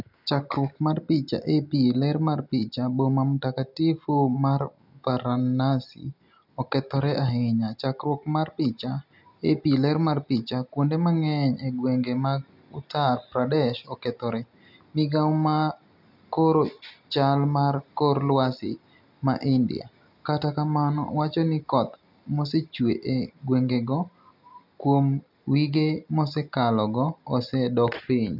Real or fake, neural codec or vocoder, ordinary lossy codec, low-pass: real; none; none; 5.4 kHz